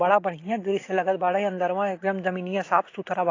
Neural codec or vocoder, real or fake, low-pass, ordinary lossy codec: none; real; 7.2 kHz; AAC, 32 kbps